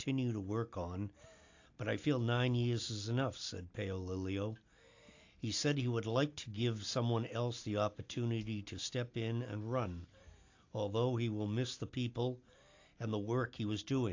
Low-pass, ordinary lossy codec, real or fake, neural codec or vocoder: 7.2 kHz; AAC, 48 kbps; real; none